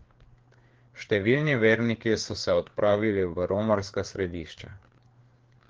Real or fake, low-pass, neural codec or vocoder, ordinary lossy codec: fake; 7.2 kHz; codec, 16 kHz, 4 kbps, FreqCodec, larger model; Opus, 16 kbps